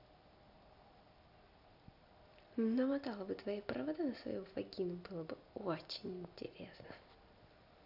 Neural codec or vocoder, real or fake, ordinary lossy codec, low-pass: none; real; none; 5.4 kHz